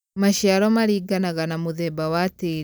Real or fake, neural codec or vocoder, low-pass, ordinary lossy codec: real; none; none; none